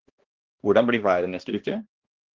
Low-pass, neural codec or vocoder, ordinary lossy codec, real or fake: 7.2 kHz; codec, 16 kHz, 1 kbps, X-Codec, HuBERT features, trained on balanced general audio; Opus, 16 kbps; fake